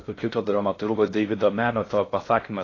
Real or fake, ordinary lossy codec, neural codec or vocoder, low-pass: fake; AAC, 32 kbps; codec, 16 kHz in and 24 kHz out, 0.6 kbps, FocalCodec, streaming, 4096 codes; 7.2 kHz